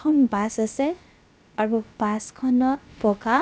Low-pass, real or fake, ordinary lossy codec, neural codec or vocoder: none; fake; none; codec, 16 kHz, about 1 kbps, DyCAST, with the encoder's durations